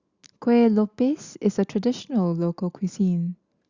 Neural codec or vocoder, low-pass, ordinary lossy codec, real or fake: none; 7.2 kHz; Opus, 64 kbps; real